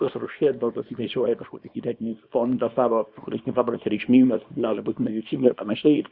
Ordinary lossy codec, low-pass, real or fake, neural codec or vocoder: Opus, 64 kbps; 5.4 kHz; fake; codec, 24 kHz, 0.9 kbps, WavTokenizer, small release